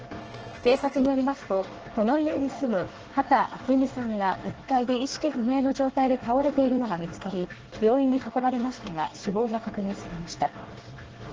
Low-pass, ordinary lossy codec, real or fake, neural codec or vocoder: 7.2 kHz; Opus, 16 kbps; fake; codec, 24 kHz, 1 kbps, SNAC